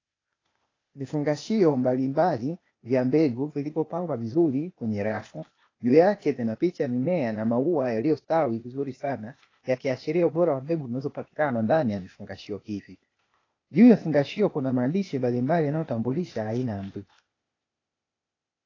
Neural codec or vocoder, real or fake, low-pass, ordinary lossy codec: codec, 16 kHz, 0.8 kbps, ZipCodec; fake; 7.2 kHz; AAC, 32 kbps